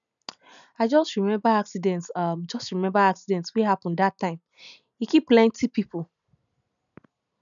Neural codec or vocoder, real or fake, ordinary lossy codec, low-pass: none; real; none; 7.2 kHz